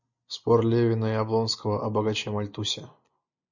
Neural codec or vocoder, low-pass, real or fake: none; 7.2 kHz; real